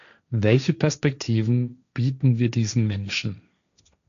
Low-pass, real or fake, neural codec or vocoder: 7.2 kHz; fake; codec, 16 kHz, 1.1 kbps, Voila-Tokenizer